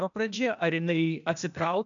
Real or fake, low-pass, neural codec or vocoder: fake; 7.2 kHz; codec, 16 kHz, 0.8 kbps, ZipCodec